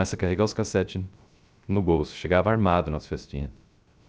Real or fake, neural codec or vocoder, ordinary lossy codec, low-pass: fake; codec, 16 kHz, 0.3 kbps, FocalCodec; none; none